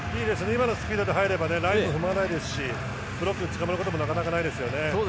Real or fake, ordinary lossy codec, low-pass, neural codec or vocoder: real; none; none; none